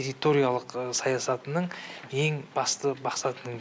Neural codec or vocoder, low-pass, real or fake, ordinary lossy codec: none; none; real; none